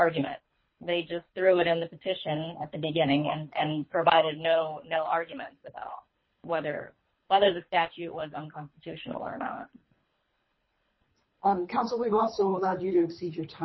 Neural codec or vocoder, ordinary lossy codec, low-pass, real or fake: codec, 24 kHz, 3 kbps, HILCodec; MP3, 24 kbps; 7.2 kHz; fake